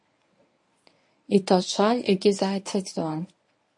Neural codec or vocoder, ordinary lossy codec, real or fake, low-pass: codec, 24 kHz, 0.9 kbps, WavTokenizer, medium speech release version 1; MP3, 48 kbps; fake; 10.8 kHz